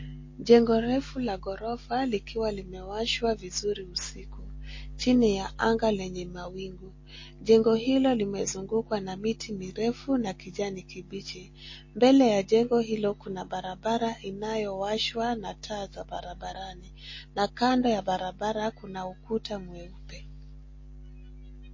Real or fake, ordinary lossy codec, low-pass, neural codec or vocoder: real; MP3, 32 kbps; 7.2 kHz; none